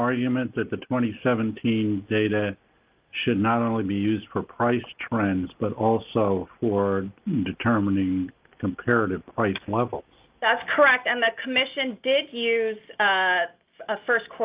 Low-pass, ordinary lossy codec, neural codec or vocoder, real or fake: 3.6 kHz; Opus, 32 kbps; none; real